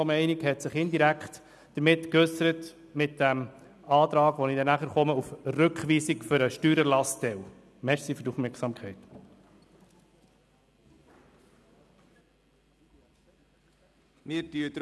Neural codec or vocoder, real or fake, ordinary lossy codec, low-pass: none; real; none; none